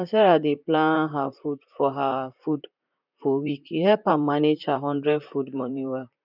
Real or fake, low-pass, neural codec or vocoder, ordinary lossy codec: fake; 5.4 kHz; vocoder, 44.1 kHz, 128 mel bands, Pupu-Vocoder; none